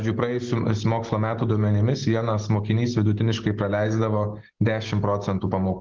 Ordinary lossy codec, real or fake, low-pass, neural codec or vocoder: Opus, 16 kbps; real; 7.2 kHz; none